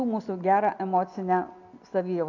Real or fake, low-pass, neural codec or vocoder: real; 7.2 kHz; none